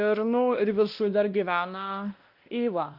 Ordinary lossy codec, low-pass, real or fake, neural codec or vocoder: Opus, 32 kbps; 5.4 kHz; fake; codec, 16 kHz, 1 kbps, X-Codec, WavLM features, trained on Multilingual LibriSpeech